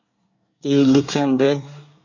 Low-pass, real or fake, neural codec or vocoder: 7.2 kHz; fake; codec, 24 kHz, 1 kbps, SNAC